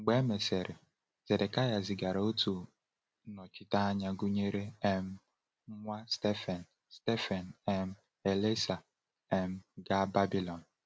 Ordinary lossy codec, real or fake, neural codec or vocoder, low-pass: none; real; none; none